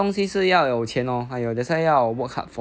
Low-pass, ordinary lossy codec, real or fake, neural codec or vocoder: none; none; real; none